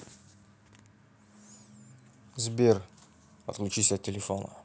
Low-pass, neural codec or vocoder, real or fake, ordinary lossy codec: none; none; real; none